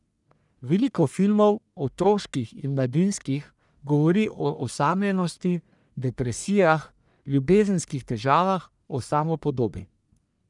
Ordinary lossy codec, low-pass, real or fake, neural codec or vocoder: none; 10.8 kHz; fake; codec, 44.1 kHz, 1.7 kbps, Pupu-Codec